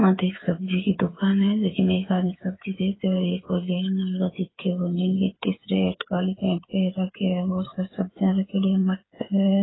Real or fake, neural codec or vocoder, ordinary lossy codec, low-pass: fake; codec, 16 kHz, 8 kbps, FreqCodec, smaller model; AAC, 16 kbps; 7.2 kHz